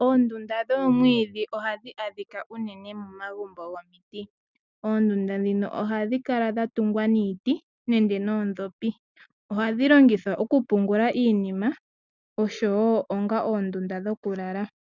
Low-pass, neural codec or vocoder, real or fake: 7.2 kHz; none; real